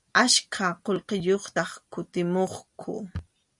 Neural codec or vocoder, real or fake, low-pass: none; real; 10.8 kHz